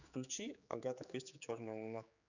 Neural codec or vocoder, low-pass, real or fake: codec, 16 kHz, 4 kbps, X-Codec, HuBERT features, trained on general audio; 7.2 kHz; fake